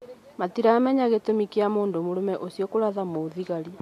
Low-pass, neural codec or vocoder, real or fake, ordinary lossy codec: 14.4 kHz; none; real; AAC, 64 kbps